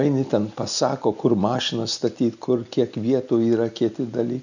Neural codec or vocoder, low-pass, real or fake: none; 7.2 kHz; real